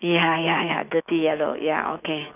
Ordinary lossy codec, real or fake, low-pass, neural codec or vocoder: none; fake; 3.6 kHz; autoencoder, 48 kHz, 128 numbers a frame, DAC-VAE, trained on Japanese speech